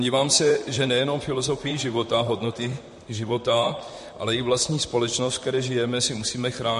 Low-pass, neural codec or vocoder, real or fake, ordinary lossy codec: 14.4 kHz; vocoder, 44.1 kHz, 128 mel bands, Pupu-Vocoder; fake; MP3, 48 kbps